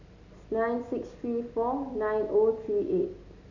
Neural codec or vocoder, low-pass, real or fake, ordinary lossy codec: none; 7.2 kHz; real; none